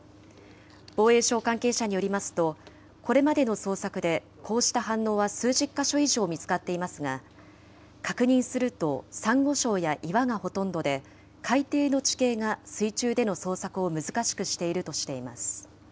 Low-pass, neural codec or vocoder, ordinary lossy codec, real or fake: none; none; none; real